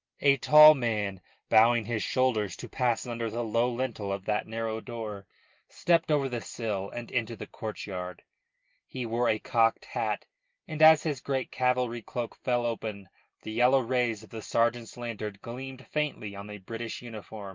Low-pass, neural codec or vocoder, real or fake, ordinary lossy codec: 7.2 kHz; none; real; Opus, 32 kbps